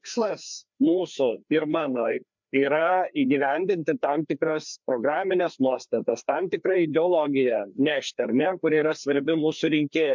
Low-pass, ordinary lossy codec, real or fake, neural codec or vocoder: 7.2 kHz; MP3, 64 kbps; fake; codec, 16 kHz, 2 kbps, FreqCodec, larger model